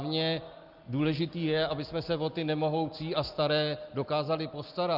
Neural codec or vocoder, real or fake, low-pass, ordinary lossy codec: none; real; 5.4 kHz; Opus, 24 kbps